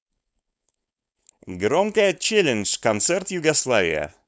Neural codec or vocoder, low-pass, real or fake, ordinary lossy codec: codec, 16 kHz, 4.8 kbps, FACodec; none; fake; none